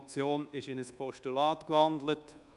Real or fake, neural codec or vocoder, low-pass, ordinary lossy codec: fake; codec, 24 kHz, 1.2 kbps, DualCodec; 10.8 kHz; none